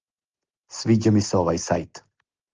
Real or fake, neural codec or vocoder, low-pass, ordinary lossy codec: real; none; 7.2 kHz; Opus, 16 kbps